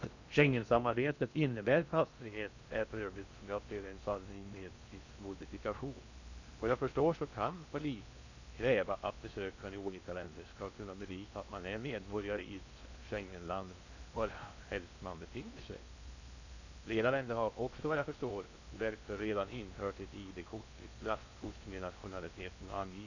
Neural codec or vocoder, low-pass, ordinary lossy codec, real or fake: codec, 16 kHz in and 24 kHz out, 0.6 kbps, FocalCodec, streaming, 2048 codes; 7.2 kHz; none; fake